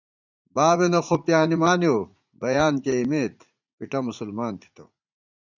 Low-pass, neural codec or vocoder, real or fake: 7.2 kHz; vocoder, 44.1 kHz, 80 mel bands, Vocos; fake